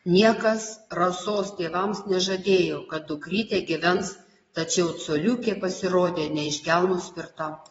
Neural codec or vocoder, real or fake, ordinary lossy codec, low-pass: vocoder, 22.05 kHz, 80 mel bands, WaveNeXt; fake; AAC, 24 kbps; 9.9 kHz